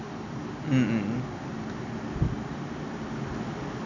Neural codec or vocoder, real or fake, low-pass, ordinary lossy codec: none; real; 7.2 kHz; none